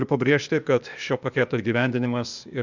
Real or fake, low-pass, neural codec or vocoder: fake; 7.2 kHz; codec, 16 kHz, 0.8 kbps, ZipCodec